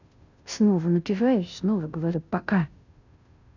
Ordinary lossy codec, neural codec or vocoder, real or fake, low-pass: none; codec, 16 kHz, 0.5 kbps, FunCodec, trained on Chinese and English, 25 frames a second; fake; 7.2 kHz